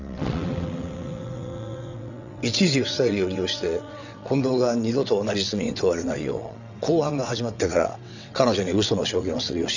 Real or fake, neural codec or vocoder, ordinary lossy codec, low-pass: fake; vocoder, 22.05 kHz, 80 mel bands, WaveNeXt; none; 7.2 kHz